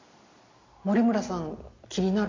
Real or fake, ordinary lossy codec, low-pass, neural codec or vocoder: real; AAC, 32 kbps; 7.2 kHz; none